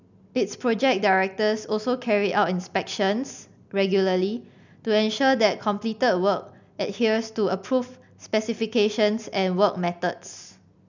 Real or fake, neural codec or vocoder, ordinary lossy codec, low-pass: real; none; none; 7.2 kHz